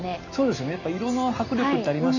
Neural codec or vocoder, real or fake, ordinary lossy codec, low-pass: none; real; none; 7.2 kHz